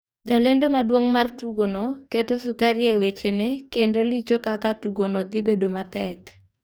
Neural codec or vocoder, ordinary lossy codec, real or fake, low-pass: codec, 44.1 kHz, 2.6 kbps, DAC; none; fake; none